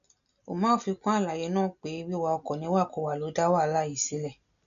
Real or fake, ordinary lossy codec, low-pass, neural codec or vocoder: real; AAC, 96 kbps; 7.2 kHz; none